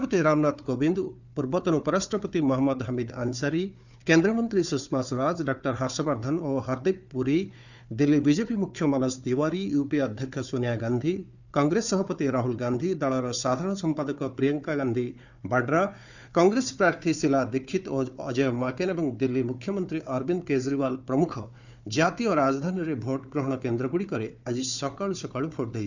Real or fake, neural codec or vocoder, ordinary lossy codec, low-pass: fake; codec, 16 kHz, 4 kbps, FunCodec, trained on Chinese and English, 50 frames a second; none; 7.2 kHz